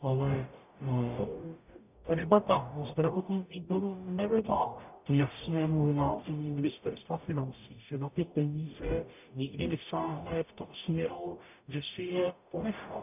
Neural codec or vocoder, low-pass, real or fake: codec, 44.1 kHz, 0.9 kbps, DAC; 3.6 kHz; fake